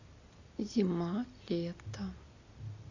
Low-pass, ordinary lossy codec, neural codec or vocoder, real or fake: 7.2 kHz; AAC, 48 kbps; none; real